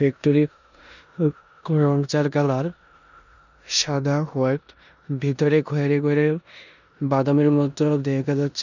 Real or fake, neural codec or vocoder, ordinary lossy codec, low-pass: fake; codec, 16 kHz in and 24 kHz out, 0.9 kbps, LongCat-Audio-Codec, four codebook decoder; none; 7.2 kHz